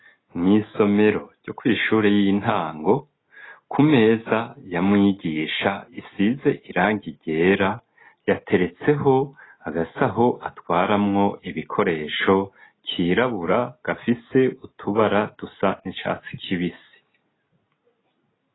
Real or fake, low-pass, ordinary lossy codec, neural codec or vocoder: real; 7.2 kHz; AAC, 16 kbps; none